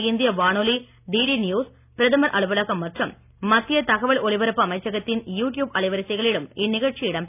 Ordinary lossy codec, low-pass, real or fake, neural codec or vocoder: none; 3.6 kHz; real; none